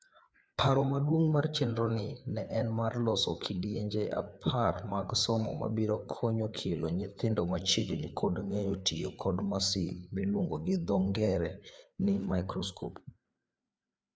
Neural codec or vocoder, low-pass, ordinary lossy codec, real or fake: codec, 16 kHz, 4 kbps, FreqCodec, larger model; none; none; fake